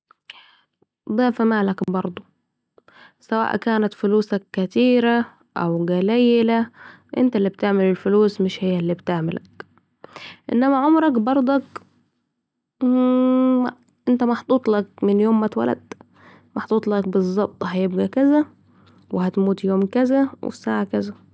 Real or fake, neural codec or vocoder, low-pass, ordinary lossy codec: real; none; none; none